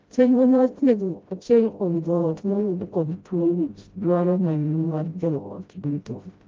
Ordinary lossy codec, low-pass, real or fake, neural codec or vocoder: Opus, 24 kbps; 7.2 kHz; fake; codec, 16 kHz, 0.5 kbps, FreqCodec, smaller model